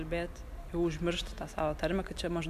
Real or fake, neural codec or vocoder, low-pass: real; none; 14.4 kHz